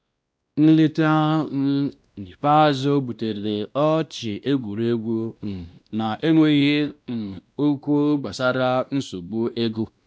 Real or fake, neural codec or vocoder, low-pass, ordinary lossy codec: fake; codec, 16 kHz, 1 kbps, X-Codec, WavLM features, trained on Multilingual LibriSpeech; none; none